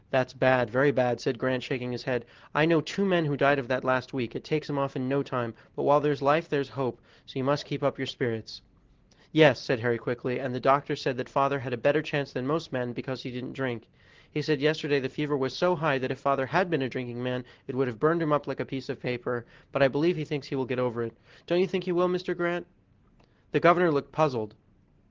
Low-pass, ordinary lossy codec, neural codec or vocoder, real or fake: 7.2 kHz; Opus, 16 kbps; none; real